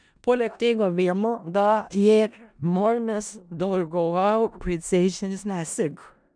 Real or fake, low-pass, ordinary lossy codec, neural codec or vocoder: fake; 9.9 kHz; none; codec, 16 kHz in and 24 kHz out, 0.4 kbps, LongCat-Audio-Codec, four codebook decoder